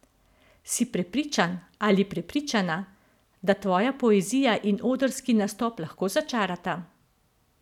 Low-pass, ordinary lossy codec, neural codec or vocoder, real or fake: 19.8 kHz; none; none; real